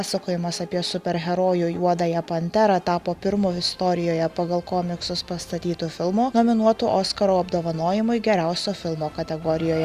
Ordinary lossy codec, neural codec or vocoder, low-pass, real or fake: Opus, 64 kbps; none; 14.4 kHz; real